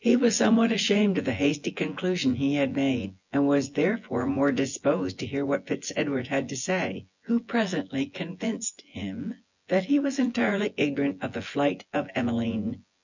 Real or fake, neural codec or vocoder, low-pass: fake; vocoder, 24 kHz, 100 mel bands, Vocos; 7.2 kHz